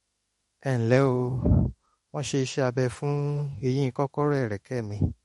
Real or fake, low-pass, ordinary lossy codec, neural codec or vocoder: fake; 19.8 kHz; MP3, 48 kbps; autoencoder, 48 kHz, 32 numbers a frame, DAC-VAE, trained on Japanese speech